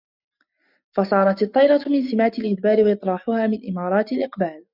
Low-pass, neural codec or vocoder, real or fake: 5.4 kHz; none; real